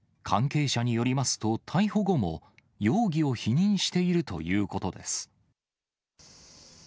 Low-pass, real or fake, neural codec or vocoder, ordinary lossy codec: none; real; none; none